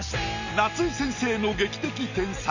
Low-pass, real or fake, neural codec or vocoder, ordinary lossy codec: 7.2 kHz; real; none; none